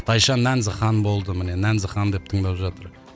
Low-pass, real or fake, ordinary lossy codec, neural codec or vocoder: none; real; none; none